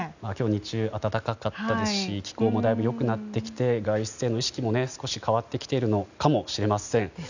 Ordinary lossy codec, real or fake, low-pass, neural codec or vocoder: none; real; 7.2 kHz; none